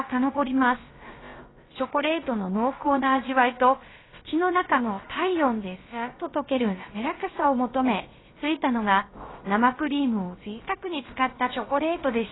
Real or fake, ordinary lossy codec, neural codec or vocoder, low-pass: fake; AAC, 16 kbps; codec, 16 kHz, about 1 kbps, DyCAST, with the encoder's durations; 7.2 kHz